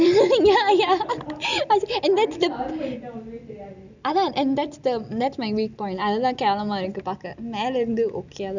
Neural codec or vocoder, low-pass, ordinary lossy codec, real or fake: none; 7.2 kHz; none; real